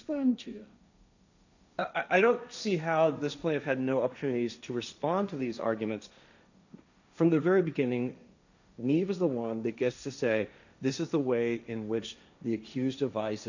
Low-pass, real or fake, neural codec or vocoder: 7.2 kHz; fake; codec, 16 kHz, 1.1 kbps, Voila-Tokenizer